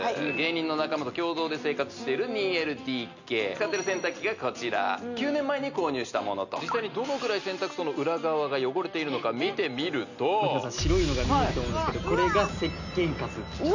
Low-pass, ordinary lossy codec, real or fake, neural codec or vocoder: 7.2 kHz; none; real; none